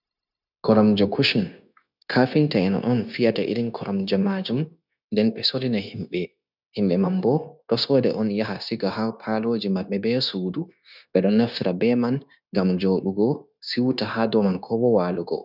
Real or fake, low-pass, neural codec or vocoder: fake; 5.4 kHz; codec, 16 kHz, 0.9 kbps, LongCat-Audio-Codec